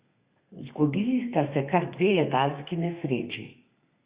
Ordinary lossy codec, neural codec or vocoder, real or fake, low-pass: Opus, 64 kbps; codec, 32 kHz, 1.9 kbps, SNAC; fake; 3.6 kHz